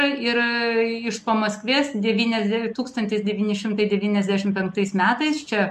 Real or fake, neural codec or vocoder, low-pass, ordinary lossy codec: real; none; 14.4 kHz; MP3, 64 kbps